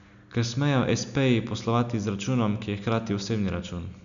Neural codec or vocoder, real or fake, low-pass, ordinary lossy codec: none; real; 7.2 kHz; none